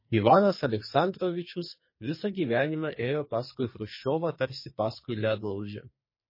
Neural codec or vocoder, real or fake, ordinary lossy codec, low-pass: codec, 44.1 kHz, 2.6 kbps, SNAC; fake; MP3, 24 kbps; 5.4 kHz